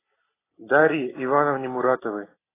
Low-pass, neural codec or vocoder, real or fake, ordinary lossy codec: 3.6 kHz; none; real; AAC, 16 kbps